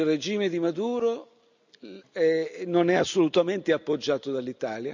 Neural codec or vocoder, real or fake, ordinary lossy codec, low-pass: none; real; none; 7.2 kHz